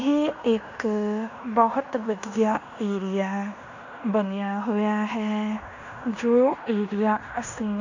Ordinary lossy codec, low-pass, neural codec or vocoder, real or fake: none; 7.2 kHz; codec, 16 kHz in and 24 kHz out, 0.9 kbps, LongCat-Audio-Codec, fine tuned four codebook decoder; fake